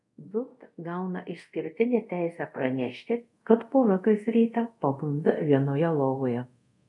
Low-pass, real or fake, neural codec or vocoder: 10.8 kHz; fake; codec, 24 kHz, 0.5 kbps, DualCodec